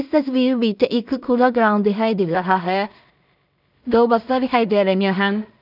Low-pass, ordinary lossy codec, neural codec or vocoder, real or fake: 5.4 kHz; none; codec, 16 kHz in and 24 kHz out, 0.4 kbps, LongCat-Audio-Codec, two codebook decoder; fake